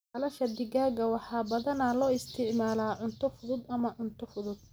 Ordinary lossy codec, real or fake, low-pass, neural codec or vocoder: none; real; none; none